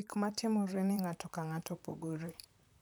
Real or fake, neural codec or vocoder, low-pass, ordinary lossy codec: fake; vocoder, 44.1 kHz, 128 mel bands, Pupu-Vocoder; none; none